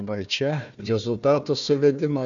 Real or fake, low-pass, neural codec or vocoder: fake; 7.2 kHz; codec, 16 kHz, 1 kbps, FunCodec, trained on Chinese and English, 50 frames a second